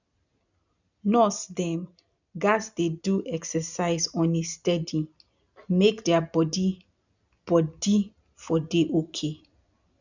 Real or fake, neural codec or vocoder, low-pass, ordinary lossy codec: real; none; 7.2 kHz; none